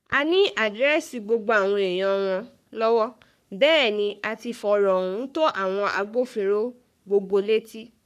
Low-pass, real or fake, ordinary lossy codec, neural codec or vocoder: 14.4 kHz; fake; none; codec, 44.1 kHz, 3.4 kbps, Pupu-Codec